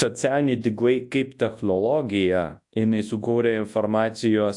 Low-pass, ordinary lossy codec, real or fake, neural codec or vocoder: 10.8 kHz; AAC, 64 kbps; fake; codec, 24 kHz, 0.9 kbps, WavTokenizer, large speech release